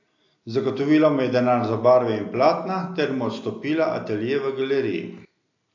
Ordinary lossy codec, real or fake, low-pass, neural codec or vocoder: AAC, 48 kbps; real; 7.2 kHz; none